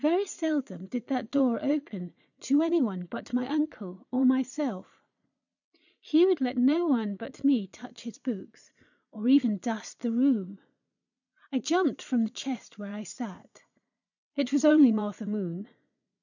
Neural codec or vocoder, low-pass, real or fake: vocoder, 22.05 kHz, 80 mel bands, Vocos; 7.2 kHz; fake